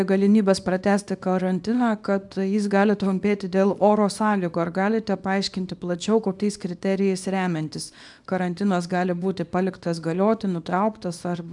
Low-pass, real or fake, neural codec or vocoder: 10.8 kHz; fake; codec, 24 kHz, 0.9 kbps, WavTokenizer, small release